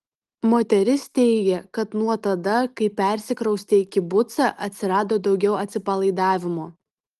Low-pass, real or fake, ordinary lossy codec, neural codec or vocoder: 14.4 kHz; real; Opus, 24 kbps; none